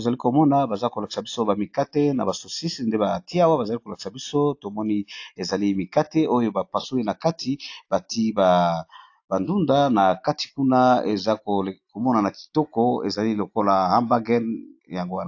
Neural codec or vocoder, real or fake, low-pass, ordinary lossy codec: none; real; 7.2 kHz; AAC, 48 kbps